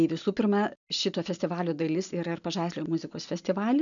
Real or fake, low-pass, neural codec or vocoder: real; 7.2 kHz; none